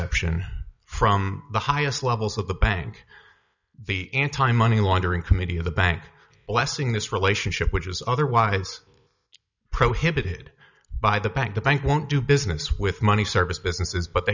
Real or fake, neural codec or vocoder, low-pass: real; none; 7.2 kHz